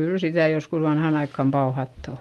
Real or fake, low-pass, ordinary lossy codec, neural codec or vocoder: real; 19.8 kHz; Opus, 24 kbps; none